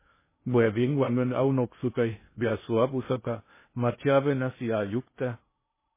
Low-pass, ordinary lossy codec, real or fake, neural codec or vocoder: 3.6 kHz; MP3, 16 kbps; fake; codec, 16 kHz in and 24 kHz out, 0.6 kbps, FocalCodec, streaming, 2048 codes